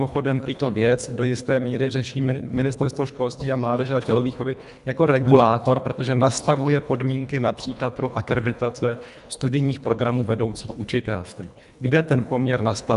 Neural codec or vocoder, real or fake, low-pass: codec, 24 kHz, 1.5 kbps, HILCodec; fake; 10.8 kHz